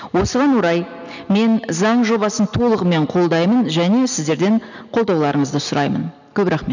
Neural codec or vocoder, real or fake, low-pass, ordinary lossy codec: none; real; 7.2 kHz; none